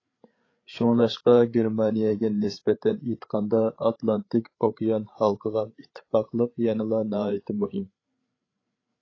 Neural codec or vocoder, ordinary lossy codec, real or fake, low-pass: codec, 16 kHz, 8 kbps, FreqCodec, larger model; AAC, 32 kbps; fake; 7.2 kHz